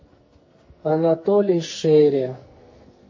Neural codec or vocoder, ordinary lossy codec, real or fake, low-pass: codec, 44.1 kHz, 2.6 kbps, SNAC; MP3, 32 kbps; fake; 7.2 kHz